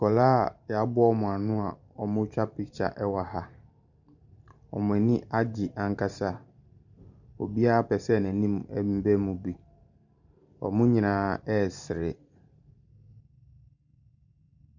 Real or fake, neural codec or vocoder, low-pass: fake; vocoder, 44.1 kHz, 128 mel bands every 512 samples, BigVGAN v2; 7.2 kHz